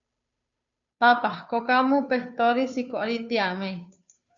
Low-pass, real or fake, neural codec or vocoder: 7.2 kHz; fake; codec, 16 kHz, 2 kbps, FunCodec, trained on Chinese and English, 25 frames a second